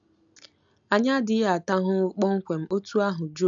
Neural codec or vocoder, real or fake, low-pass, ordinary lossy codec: none; real; 7.2 kHz; AAC, 64 kbps